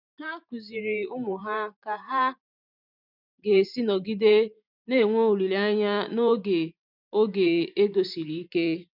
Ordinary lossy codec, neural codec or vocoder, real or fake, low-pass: none; vocoder, 44.1 kHz, 128 mel bands every 512 samples, BigVGAN v2; fake; 5.4 kHz